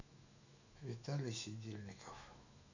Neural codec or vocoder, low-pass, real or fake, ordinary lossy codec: autoencoder, 48 kHz, 128 numbers a frame, DAC-VAE, trained on Japanese speech; 7.2 kHz; fake; none